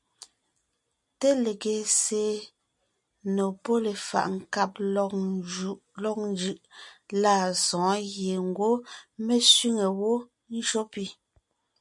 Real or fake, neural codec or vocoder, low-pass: real; none; 10.8 kHz